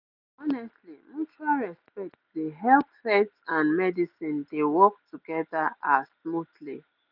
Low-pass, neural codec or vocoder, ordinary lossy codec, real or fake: 5.4 kHz; none; none; real